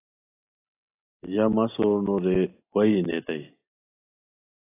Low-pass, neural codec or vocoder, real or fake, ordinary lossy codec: 3.6 kHz; none; real; AAC, 24 kbps